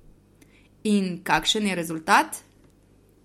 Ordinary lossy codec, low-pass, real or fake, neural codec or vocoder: MP3, 64 kbps; 19.8 kHz; real; none